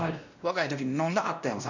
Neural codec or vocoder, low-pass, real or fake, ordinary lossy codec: codec, 16 kHz, 1 kbps, X-Codec, WavLM features, trained on Multilingual LibriSpeech; 7.2 kHz; fake; none